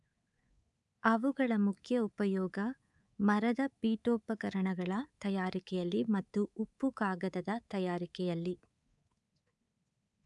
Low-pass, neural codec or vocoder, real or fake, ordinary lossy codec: 10.8 kHz; codec, 24 kHz, 3.1 kbps, DualCodec; fake; none